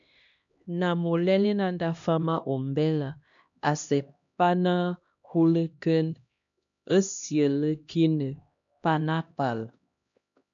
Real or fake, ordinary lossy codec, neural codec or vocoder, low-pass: fake; MP3, 64 kbps; codec, 16 kHz, 1 kbps, X-Codec, HuBERT features, trained on LibriSpeech; 7.2 kHz